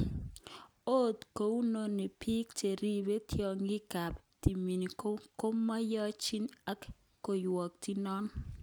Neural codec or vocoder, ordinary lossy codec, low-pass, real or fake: none; none; none; real